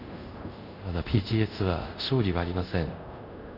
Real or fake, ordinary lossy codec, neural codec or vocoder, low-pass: fake; none; codec, 24 kHz, 0.5 kbps, DualCodec; 5.4 kHz